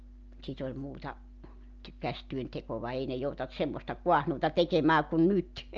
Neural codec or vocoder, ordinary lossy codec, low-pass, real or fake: none; Opus, 24 kbps; 7.2 kHz; real